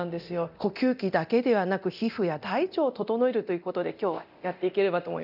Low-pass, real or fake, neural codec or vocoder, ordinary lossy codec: 5.4 kHz; fake; codec, 24 kHz, 0.9 kbps, DualCodec; none